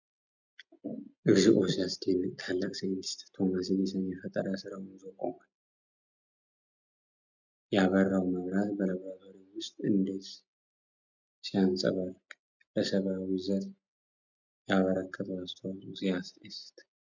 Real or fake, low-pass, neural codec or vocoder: real; 7.2 kHz; none